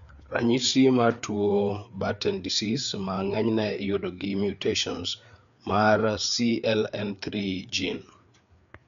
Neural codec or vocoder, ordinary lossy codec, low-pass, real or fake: codec, 16 kHz, 4 kbps, FreqCodec, larger model; none; 7.2 kHz; fake